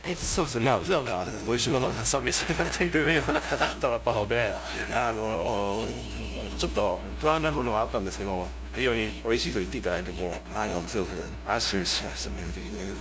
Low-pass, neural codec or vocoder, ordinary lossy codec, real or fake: none; codec, 16 kHz, 0.5 kbps, FunCodec, trained on LibriTTS, 25 frames a second; none; fake